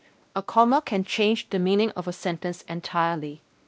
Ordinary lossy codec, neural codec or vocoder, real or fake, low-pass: none; codec, 16 kHz, 1 kbps, X-Codec, WavLM features, trained on Multilingual LibriSpeech; fake; none